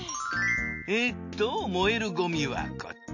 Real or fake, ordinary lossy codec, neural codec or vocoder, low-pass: real; none; none; 7.2 kHz